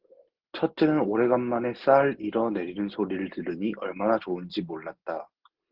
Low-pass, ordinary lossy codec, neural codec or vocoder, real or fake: 5.4 kHz; Opus, 16 kbps; none; real